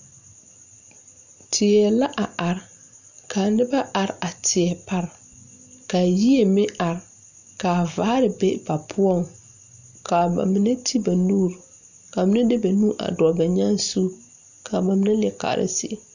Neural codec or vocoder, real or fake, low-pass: none; real; 7.2 kHz